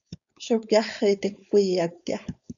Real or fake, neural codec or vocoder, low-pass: fake; codec, 16 kHz, 4.8 kbps, FACodec; 7.2 kHz